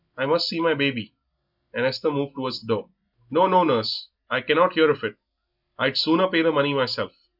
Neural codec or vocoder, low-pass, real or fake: none; 5.4 kHz; real